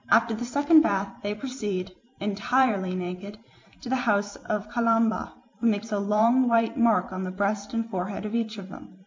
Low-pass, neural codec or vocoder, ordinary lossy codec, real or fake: 7.2 kHz; vocoder, 44.1 kHz, 128 mel bands every 512 samples, BigVGAN v2; MP3, 64 kbps; fake